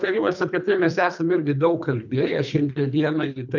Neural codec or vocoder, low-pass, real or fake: codec, 24 kHz, 3 kbps, HILCodec; 7.2 kHz; fake